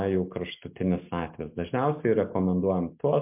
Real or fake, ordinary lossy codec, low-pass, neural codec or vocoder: real; MP3, 32 kbps; 3.6 kHz; none